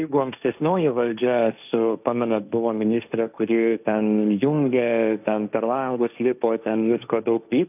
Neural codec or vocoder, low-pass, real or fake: codec, 16 kHz, 1.1 kbps, Voila-Tokenizer; 3.6 kHz; fake